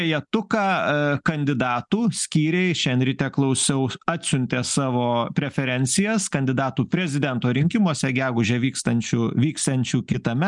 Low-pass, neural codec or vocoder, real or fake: 10.8 kHz; none; real